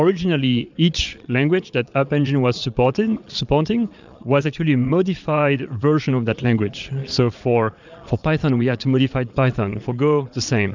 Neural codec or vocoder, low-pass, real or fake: vocoder, 22.05 kHz, 80 mel bands, Vocos; 7.2 kHz; fake